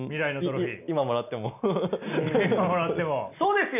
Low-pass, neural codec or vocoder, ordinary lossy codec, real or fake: 3.6 kHz; none; none; real